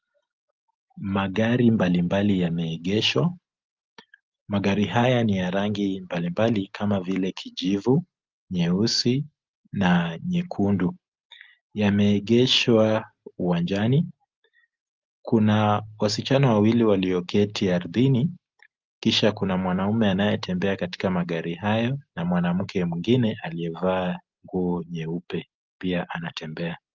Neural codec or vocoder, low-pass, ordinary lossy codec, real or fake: none; 7.2 kHz; Opus, 32 kbps; real